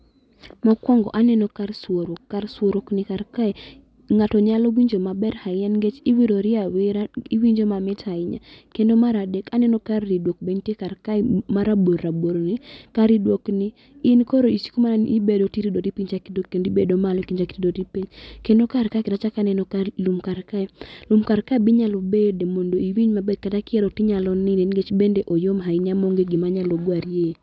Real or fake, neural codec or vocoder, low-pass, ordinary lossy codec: real; none; none; none